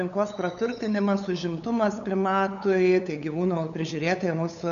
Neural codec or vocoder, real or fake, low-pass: codec, 16 kHz, 8 kbps, FunCodec, trained on LibriTTS, 25 frames a second; fake; 7.2 kHz